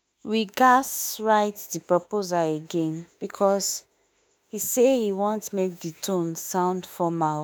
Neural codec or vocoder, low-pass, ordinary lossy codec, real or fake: autoencoder, 48 kHz, 32 numbers a frame, DAC-VAE, trained on Japanese speech; none; none; fake